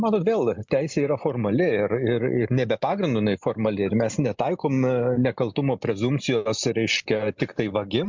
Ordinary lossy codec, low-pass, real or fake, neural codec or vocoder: MP3, 64 kbps; 7.2 kHz; real; none